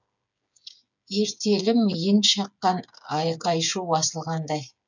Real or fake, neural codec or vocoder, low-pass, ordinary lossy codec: fake; codec, 16 kHz, 8 kbps, FreqCodec, smaller model; 7.2 kHz; none